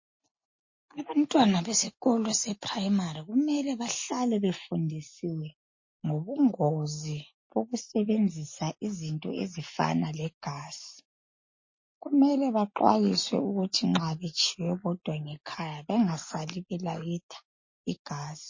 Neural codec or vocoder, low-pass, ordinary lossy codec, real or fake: none; 7.2 kHz; MP3, 32 kbps; real